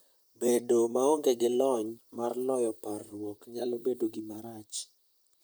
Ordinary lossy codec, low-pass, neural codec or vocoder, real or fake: none; none; vocoder, 44.1 kHz, 128 mel bands, Pupu-Vocoder; fake